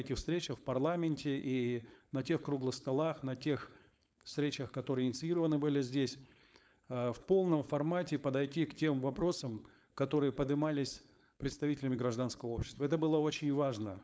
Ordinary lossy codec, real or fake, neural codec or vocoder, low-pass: none; fake; codec, 16 kHz, 4.8 kbps, FACodec; none